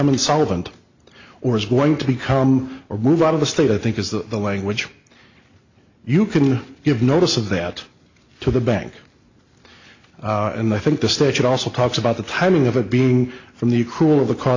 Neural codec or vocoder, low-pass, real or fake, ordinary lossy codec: none; 7.2 kHz; real; AAC, 48 kbps